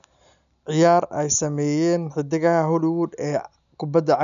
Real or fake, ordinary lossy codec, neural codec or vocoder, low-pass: real; none; none; 7.2 kHz